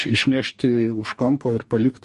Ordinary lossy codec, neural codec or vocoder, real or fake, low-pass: MP3, 48 kbps; codec, 44.1 kHz, 2.6 kbps, DAC; fake; 14.4 kHz